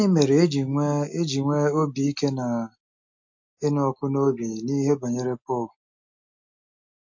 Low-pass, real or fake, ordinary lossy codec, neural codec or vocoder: 7.2 kHz; real; MP3, 48 kbps; none